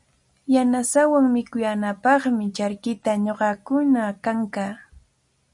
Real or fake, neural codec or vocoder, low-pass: real; none; 10.8 kHz